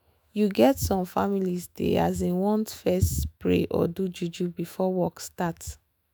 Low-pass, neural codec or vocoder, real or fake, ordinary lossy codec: none; autoencoder, 48 kHz, 128 numbers a frame, DAC-VAE, trained on Japanese speech; fake; none